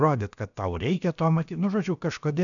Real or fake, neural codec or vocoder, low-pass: fake; codec, 16 kHz, about 1 kbps, DyCAST, with the encoder's durations; 7.2 kHz